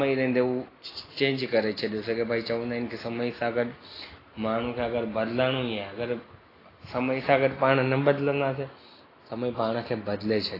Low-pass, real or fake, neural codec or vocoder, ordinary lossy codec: 5.4 kHz; real; none; AAC, 24 kbps